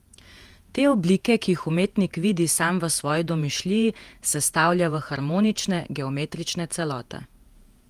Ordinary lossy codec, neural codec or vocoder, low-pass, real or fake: Opus, 32 kbps; vocoder, 48 kHz, 128 mel bands, Vocos; 14.4 kHz; fake